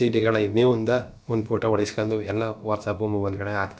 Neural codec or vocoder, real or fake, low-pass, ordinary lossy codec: codec, 16 kHz, about 1 kbps, DyCAST, with the encoder's durations; fake; none; none